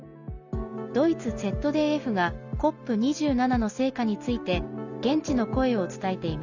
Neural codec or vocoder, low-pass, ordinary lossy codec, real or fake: none; 7.2 kHz; none; real